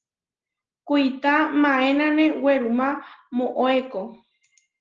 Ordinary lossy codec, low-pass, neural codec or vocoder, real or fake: Opus, 16 kbps; 7.2 kHz; none; real